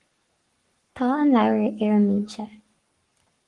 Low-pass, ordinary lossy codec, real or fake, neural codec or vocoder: 10.8 kHz; Opus, 24 kbps; fake; codec, 44.1 kHz, 7.8 kbps, Pupu-Codec